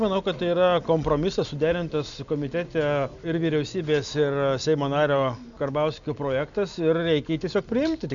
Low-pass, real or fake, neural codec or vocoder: 7.2 kHz; real; none